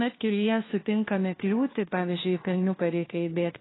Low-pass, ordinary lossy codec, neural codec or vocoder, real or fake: 7.2 kHz; AAC, 16 kbps; codec, 16 kHz, 1 kbps, FunCodec, trained on LibriTTS, 50 frames a second; fake